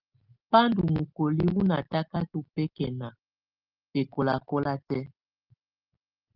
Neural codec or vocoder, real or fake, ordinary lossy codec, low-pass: none; real; Opus, 24 kbps; 5.4 kHz